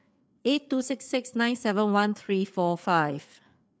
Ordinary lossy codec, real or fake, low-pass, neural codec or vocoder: none; fake; none; codec, 16 kHz, 4 kbps, FreqCodec, larger model